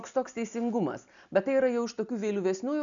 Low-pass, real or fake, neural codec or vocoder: 7.2 kHz; real; none